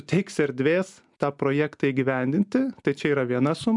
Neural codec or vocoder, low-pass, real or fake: none; 10.8 kHz; real